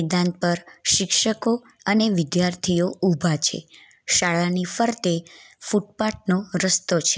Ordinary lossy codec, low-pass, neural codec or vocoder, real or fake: none; none; none; real